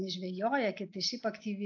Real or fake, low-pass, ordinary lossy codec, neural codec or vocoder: real; 7.2 kHz; AAC, 48 kbps; none